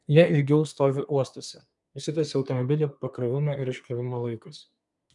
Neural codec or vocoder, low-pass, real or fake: codec, 24 kHz, 1 kbps, SNAC; 10.8 kHz; fake